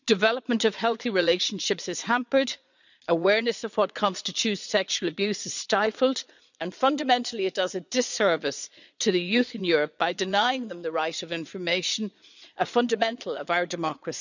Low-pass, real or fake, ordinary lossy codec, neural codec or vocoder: 7.2 kHz; fake; none; vocoder, 22.05 kHz, 80 mel bands, Vocos